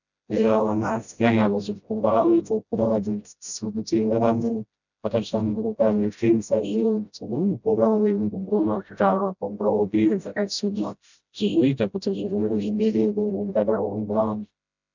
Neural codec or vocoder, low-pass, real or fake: codec, 16 kHz, 0.5 kbps, FreqCodec, smaller model; 7.2 kHz; fake